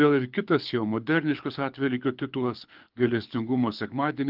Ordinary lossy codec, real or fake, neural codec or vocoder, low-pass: Opus, 24 kbps; fake; codec, 24 kHz, 6 kbps, HILCodec; 5.4 kHz